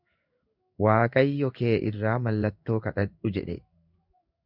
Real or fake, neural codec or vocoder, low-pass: fake; codec, 16 kHz in and 24 kHz out, 1 kbps, XY-Tokenizer; 5.4 kHz